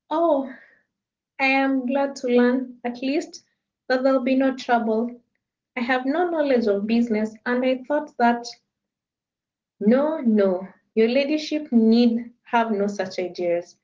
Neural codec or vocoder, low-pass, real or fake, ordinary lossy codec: none; 7.2 kHz; real; Opus, 16 kbps